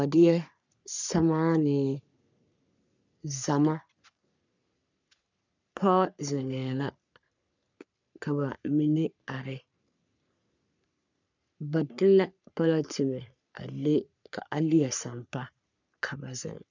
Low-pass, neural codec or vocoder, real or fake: 7.2 kHz; codec, 44.1 kHz, 3.4 kbps, Pupu-Codec; fake